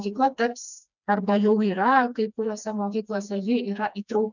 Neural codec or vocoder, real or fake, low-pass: codec, 16 kHz, 2 kbps, FreqCodec, smaller model; fake; 7.2 kHz